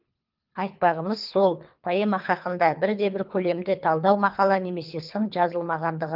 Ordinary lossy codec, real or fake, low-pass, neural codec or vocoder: Opus, 24 kbps; fake; 5.4 kHz; codec, 24 kHz, 3 kbps, HILCodec